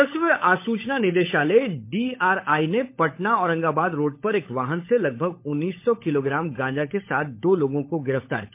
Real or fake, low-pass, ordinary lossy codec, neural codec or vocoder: fake; 3.6 kHz; MP3, 24 kbps; codec, 16 kHz, 16 kbps, FunCodec, trained on LibriTTS, 50 frames a second